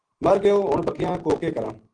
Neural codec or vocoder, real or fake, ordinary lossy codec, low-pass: none; real; Opus, 16 kbps; 9.9 kHz